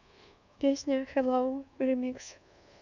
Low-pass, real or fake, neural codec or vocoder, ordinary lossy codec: 7.2 kHz; fake; codec, 24 kHz, 1.2 kbps, DualCodec; none